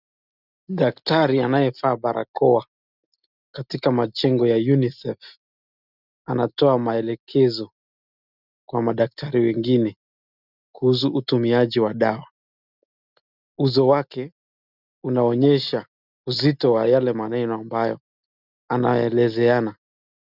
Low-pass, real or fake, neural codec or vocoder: 5.4 kHz; real; none